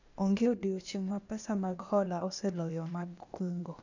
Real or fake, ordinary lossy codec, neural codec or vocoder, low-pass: fake; none; codec, 16 kHz, 0.8 kbps, ZipCodec; 7.2 kHz